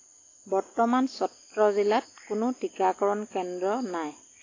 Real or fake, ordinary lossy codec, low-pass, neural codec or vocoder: real; AAC, 32 kbps; 7.2 kHz; none